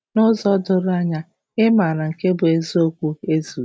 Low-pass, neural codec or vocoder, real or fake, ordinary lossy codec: none; none; real; none